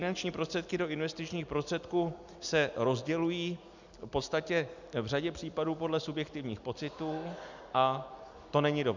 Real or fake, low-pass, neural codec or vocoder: real; 7.2 kHz; none